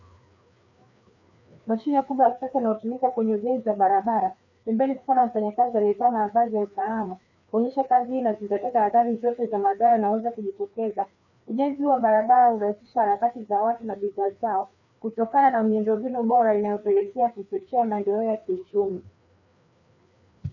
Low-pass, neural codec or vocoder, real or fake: 7.2 kHz; codec, 16 kHz, 2 kbps, FreqCodec, larger model; fake